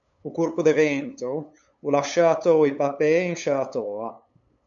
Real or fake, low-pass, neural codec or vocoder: fake; 7.2 kHz; codec, 16 kHz, 8 kbps, FunCodec, trained on LibriTTS, 25 frames a second